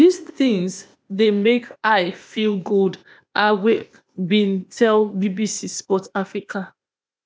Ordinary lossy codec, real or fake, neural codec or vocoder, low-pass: none; fake; codec, 16 kHz, 0.8 kbps, ZipCodec; none